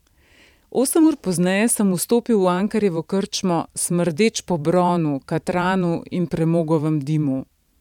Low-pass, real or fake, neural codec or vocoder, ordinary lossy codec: 19.8 kHz; fake; vocoder, 44.1 kHz, 128 mel bands every 512 samples, BigVGAN v2; none